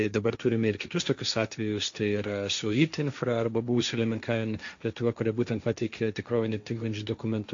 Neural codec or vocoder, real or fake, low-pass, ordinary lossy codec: codec, 16 kHz, 1.1 kbps, Voila-Tokenizer; fake; 7.2 kHz; AAC, 64 kbps